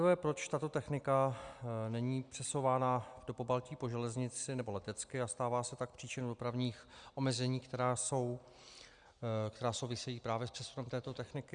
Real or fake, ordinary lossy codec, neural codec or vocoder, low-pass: real; Opus, 64 kbps; none; 9.9 kHz